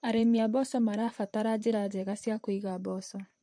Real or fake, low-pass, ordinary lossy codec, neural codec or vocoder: fake; 9.9 kHz; MP3, 48 kbps; vocoder, 44.1 kHz, 128 mel bands, Pupu-Vocoder